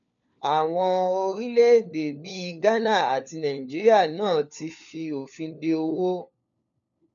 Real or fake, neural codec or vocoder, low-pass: fake; codec, 16 kHz, 4 kbps, FunCodec, trained on LibriTTS, 50 frames a second; 7.2 kHz